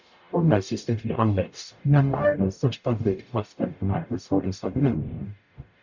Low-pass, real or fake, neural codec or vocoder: 7.2 kHz; fake; codec, 44.1 kHz, 0.9 kbps, DAC